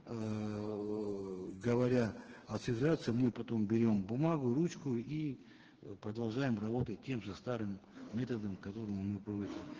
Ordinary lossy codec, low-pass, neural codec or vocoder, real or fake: Opus, 16 kbps; 7.2 kHz; codec, 16 kHz, 4 kbps, FreqCodec, smaller model; fake